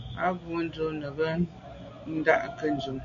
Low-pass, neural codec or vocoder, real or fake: 7.2 kHz; none; real